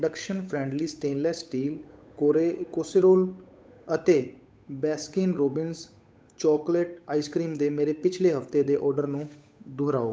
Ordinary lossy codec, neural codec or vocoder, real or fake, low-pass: none; codec, 16 kHz, 8 kbps, FunCodec, trained on Chinese and English, 25 frames a second; fake; none